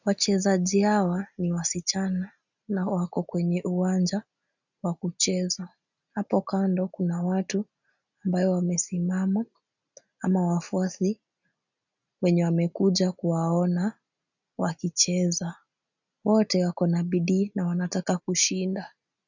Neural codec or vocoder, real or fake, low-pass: none; real; 7.2 kHz